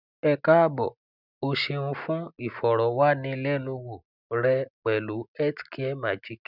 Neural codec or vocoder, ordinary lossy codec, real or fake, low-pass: none; none; real; 5.4 kHz